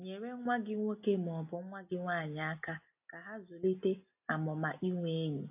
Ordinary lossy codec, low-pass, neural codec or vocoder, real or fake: AAC, 32 kbps; 3.6 kHz; none; real